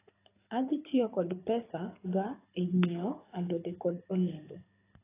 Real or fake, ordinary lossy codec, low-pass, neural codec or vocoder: fake; AAC, 16 kbps; 3.6 kHz; codec, 24 kHz, 6 kbps, HILCodec